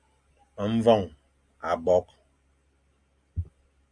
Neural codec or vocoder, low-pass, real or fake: none; 9.9 kHz; real